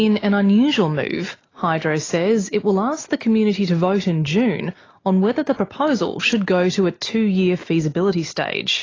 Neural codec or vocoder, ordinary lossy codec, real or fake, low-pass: none; AAC, 32 kbps; real; 7.2 kHz